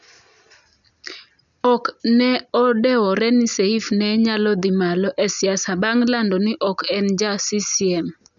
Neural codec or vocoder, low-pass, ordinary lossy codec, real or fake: none; 7.2 kHz; none; real